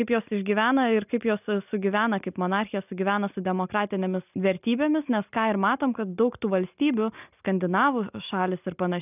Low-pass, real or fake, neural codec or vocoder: 3.6 kHz; real; none